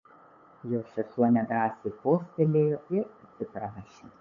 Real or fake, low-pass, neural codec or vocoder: fake; 7.2 kHz; codec, 16 kHz, 8 kbps, FunCodec, trained on LibriTTS, 25 frames a second